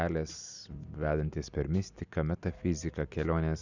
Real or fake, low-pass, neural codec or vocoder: real; 7.2 kHz; none